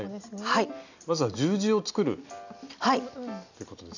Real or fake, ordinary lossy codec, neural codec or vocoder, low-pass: real; none; none; 7.2 kHz